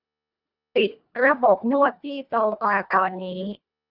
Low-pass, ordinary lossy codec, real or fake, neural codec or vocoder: 5.4 kHz; none; fake; codec, 24 kHz, 1.5 kbps, HILCodec